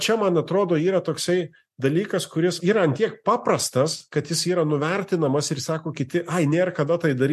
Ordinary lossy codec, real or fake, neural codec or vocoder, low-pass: MP3, 64 kbps; real; none; 14.4 kHz